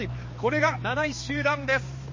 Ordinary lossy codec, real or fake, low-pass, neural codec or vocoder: MP3, 32 kbps; fake; 7.2 kHz; codec, 16 kHz, 2 kbps, X-Codec, HuBERT features, trained on balanced general audio